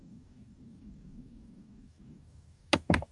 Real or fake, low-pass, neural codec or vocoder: fake; 10.8 kHz; codec, 44.1 kHz, 2.6 kbps, DAC